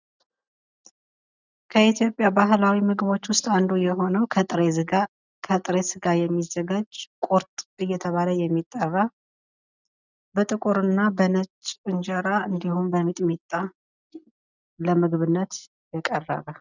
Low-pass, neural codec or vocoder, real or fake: 7.2 kHz; none; real